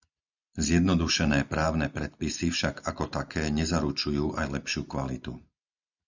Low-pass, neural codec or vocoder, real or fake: 7.2 kHz; none; real